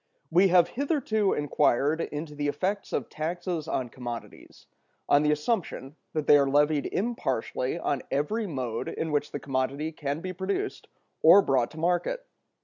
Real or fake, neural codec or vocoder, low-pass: real; none; 7.2 kHz